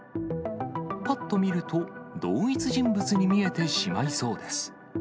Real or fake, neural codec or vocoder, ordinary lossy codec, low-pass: real; none; none; none